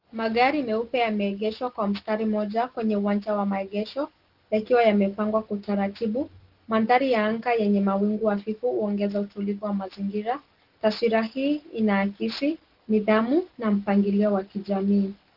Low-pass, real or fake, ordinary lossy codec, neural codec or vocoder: 5.4 kHz; real; Opus, 16 kbps; none